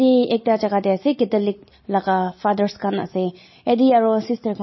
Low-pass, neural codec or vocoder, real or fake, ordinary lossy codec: 7.2 kHz; none; real; MP3, 24 kbps